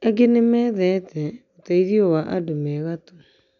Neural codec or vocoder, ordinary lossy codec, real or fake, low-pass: none; none; real; 7.2 kHz